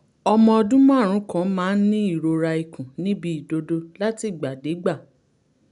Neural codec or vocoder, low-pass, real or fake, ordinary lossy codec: none; 10.8 kHz; real; none